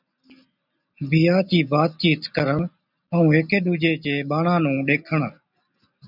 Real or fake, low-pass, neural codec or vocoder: real; 5.4 kHz; none